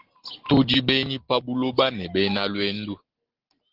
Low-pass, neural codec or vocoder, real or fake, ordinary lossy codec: 5.4 kHz; none; real; Opus, 16 kbps